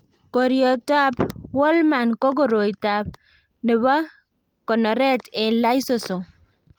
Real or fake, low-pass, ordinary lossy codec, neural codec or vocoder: real; 19.8 kHz; Opus, 32 kbps; none